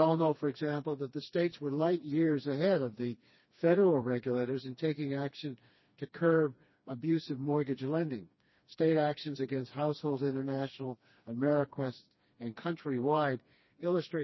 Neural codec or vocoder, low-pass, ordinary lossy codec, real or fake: codec, 16 kHz, 2 kbps, FreqCodec, smaller model; 7.2 kHz; MP3, 24 kbps; fake